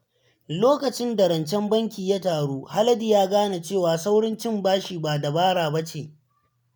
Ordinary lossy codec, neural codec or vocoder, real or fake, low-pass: none; none; real; none